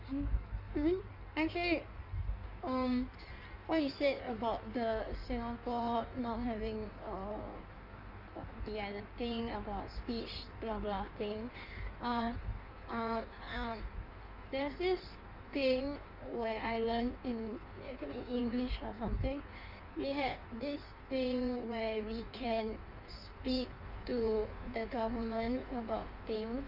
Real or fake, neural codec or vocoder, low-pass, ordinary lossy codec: fake; codec, 16 kHz in and 24 kHz out, 1.1 kbps, FireRedTTS-2 codec; 5.4 kHz; none